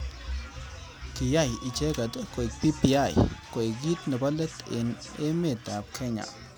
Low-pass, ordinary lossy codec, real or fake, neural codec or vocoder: none; none; real; none